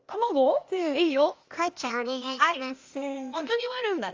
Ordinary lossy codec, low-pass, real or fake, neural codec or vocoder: Opus, 32 kbps; 7.2 kHz; fake; codec, 16 kHz, 0.8 kbps, ZipCodec